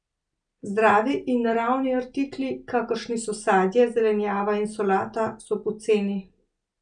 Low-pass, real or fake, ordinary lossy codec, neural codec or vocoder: 9.9 kHz; real; none; none